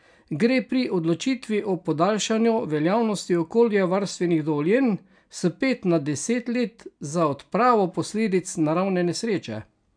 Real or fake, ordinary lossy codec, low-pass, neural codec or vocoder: real; none; 9.9 kHz; none